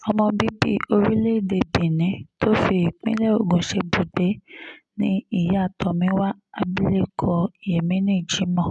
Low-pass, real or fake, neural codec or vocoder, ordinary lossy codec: 10.8 kHz; real; none; none